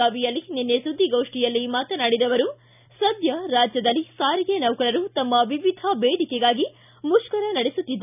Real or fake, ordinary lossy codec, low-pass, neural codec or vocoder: real; none; 3.6 kHz; none